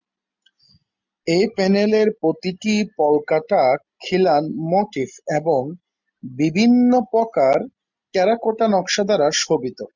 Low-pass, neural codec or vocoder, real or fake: 7.2 kHz; none; real